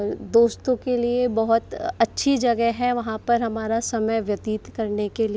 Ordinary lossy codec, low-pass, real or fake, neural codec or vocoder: none; none; real; none